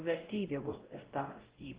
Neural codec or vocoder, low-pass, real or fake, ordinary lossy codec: codec, 16 kHz, 0.5 kbps, X-Codec, WavLM features, trained on Multilingual LibriSpeech; 3.6 kHz; fake; Opus, 16 kbps